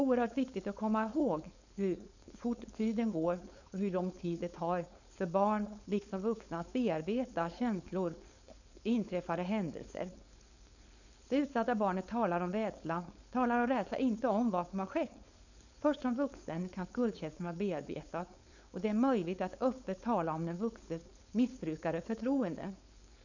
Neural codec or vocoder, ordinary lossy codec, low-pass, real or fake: codec, 16 kHz, 4.8 kbps, FACodec; none; 7.2 kHz; fake